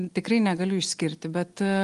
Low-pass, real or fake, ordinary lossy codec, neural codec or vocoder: 10.8 kHz; real; Opus, 24 kbps; none